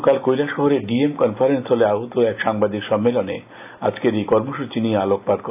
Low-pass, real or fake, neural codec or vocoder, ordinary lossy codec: 3.6 kHz; real; none; AAC, 32 kbps